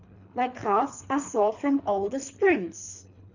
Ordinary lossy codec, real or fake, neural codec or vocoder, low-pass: none; fake; codec, 24 kHz, 3 kbps, HILCodec; 7.2 kHz